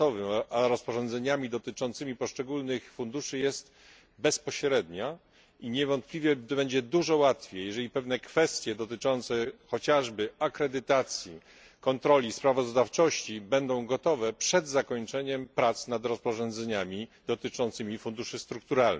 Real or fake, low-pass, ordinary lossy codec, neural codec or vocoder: real; none; none; none